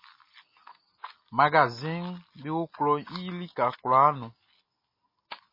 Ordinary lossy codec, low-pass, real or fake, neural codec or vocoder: MP3, 24 kbps; 5.4 kHz; real; none